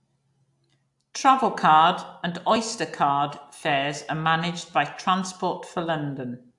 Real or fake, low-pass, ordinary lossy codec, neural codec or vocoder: real; 10.8 kHz; AAC, 64 kbps; none